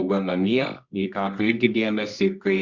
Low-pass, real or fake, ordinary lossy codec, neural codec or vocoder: 7.2 kHz; fake; Opus, 64 kbps; codec, 24 kHz, 0.9 kbps, WavTokenizer, medium music audio release